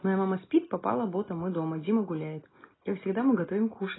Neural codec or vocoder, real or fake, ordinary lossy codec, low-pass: none; real; AAC, 16 kbps; 7.2 kHz